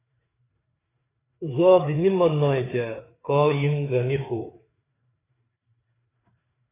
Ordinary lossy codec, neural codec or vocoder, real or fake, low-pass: AAC, 16 kbps; codec, 16 kHz, 4 kbps, FreqCodec, larger model; fake; 3.6 kHz